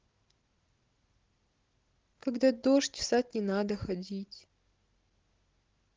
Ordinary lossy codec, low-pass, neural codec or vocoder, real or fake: Opus, 16 kbps; 7.2 kHz; none; real